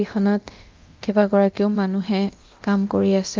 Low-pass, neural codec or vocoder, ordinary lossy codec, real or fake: 7.2 kHz; codec, 24 kHz, 0.9 kbps, DualCodec; Opus, 32 kbps; fake